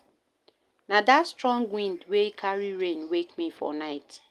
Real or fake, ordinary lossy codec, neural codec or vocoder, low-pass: real; Opus, 32 kbps; none; 14.4 kHz